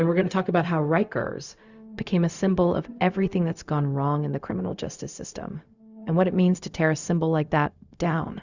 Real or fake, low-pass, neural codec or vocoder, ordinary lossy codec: fake; 7.2 kHz; codec, 16 kHz, 0.4 kbps, LongCat-Audio-Codec; Opus, 64 kbps